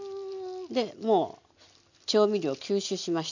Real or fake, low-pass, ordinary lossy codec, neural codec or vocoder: real; 7.2 kHz; none; none